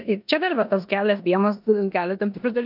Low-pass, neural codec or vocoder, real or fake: 5.4 kHz; codec, 16 kHz in and 24 kHz out, 0.9 kbps, LongCat-Audio-Codec, four codebook decoder; fake